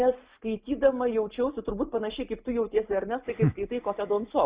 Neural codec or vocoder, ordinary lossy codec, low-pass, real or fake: none; Opus, 64 kbps; 3.6 kHz; real